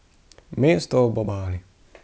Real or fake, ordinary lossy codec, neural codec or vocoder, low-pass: real; none; none; none